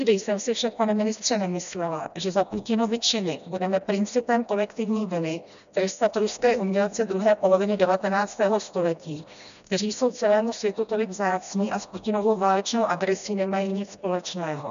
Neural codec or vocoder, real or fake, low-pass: codec, 16 kHz, 1 kbps, FreqCodec, smaller model; fake; 7.2 kHz